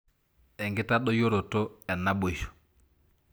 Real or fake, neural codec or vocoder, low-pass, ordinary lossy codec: real; none; none; none